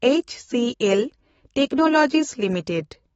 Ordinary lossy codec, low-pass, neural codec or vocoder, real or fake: AAC, 24 kbps; 19.8 kHz; autoencoder, 48 kHz, 128 numbers a frame, DAC-VAE, trained on Japanese speech; fake